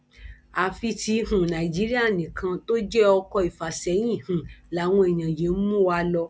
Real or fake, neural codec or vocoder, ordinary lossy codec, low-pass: real; none; none; none